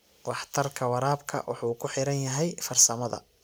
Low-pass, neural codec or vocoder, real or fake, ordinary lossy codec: none; none; real; none